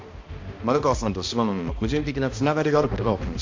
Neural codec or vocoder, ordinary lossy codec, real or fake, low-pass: codec, 16 kHz, 1 kbps, X-Codec, HuBERT features, trained on balanced general audio; MP3, 48 kbps; fake; 7.2 kHz